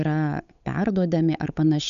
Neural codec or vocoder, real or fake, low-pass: codec, 16 kHz, 8 kbps, FunCodec, trained on Chinese and English, 25 frames a second; fake; 7.2 kHz